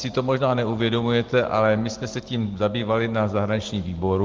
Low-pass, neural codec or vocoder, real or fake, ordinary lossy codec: 7.2 kHz; none; real; Opus, 16 kbps